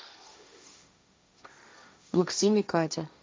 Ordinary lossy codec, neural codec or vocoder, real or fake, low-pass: none; codec, 16 kHz, 1.1 kbps, Voila-Tokenizer; fake; none